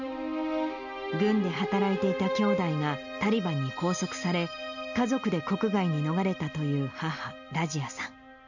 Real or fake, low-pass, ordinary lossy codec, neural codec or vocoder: real; 7.2 kHz; none; none